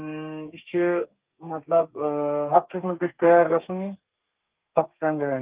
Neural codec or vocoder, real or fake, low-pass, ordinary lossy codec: codec, 32 kHz, 1.9 kbps, SNAC; fake; 3.6 kHz; Opus, 32 kbps